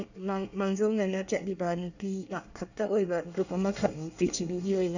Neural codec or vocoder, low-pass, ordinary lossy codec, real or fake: codec, 24 kHz, 1 kbps, SNAC; 7.2 kHz; none; fake